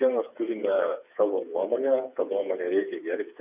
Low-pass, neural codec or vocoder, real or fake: 3.6 kHz; codec, 16 kHz, 4 kbps, FreqCodec, smaller model; fake